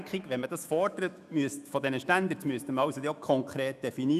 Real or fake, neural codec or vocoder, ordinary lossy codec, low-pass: fake; autoencoder, 48 kHz, 128 numbers a frame, DAC-VAE, trained on Japanese speech; none; 14.4 kHz